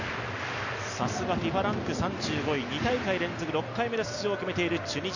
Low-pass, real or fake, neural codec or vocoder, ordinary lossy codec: 7.2 kHz; real; none; none